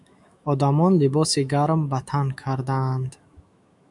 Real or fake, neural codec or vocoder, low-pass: fake; autoencoder, 48 kHz, 128 numbers a frame, DAC-VAE, trained on Japanese speech; 10.8 kHz